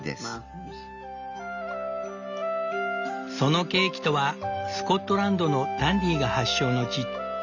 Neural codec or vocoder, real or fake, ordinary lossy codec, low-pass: none; real; none; 7.2 kHz